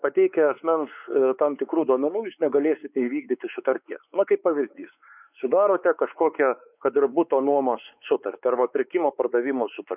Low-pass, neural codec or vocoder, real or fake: 3.6 kHz; codec, 16 kHz, 4 kbps, X-Codec, WavLM features, trained on Multilingual LibriSpeech; fake